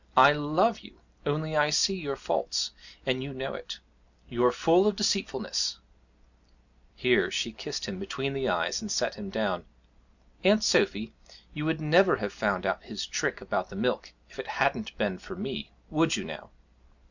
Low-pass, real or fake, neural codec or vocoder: 7.2 kHz; real; none